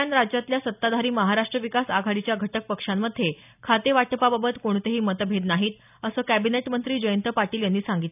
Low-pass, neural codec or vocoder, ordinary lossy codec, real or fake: 3.6 kHz; none; none; real